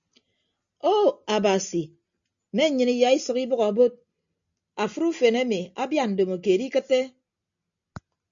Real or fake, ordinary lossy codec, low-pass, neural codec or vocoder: real; MP3, 64 kbps; 7.2 kHz; none